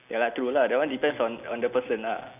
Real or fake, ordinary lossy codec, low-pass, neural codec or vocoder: real; none; 3.6 kHz; none